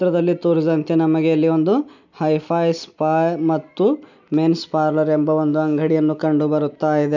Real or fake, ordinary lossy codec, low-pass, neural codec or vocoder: real; none; 7.2 kHz; none